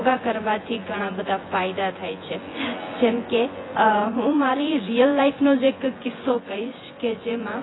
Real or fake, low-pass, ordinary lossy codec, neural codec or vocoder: fake; 7.2 kHz; AAC, 16 kbps; vocoder, 24 kHz, 100 mel bands, Vocos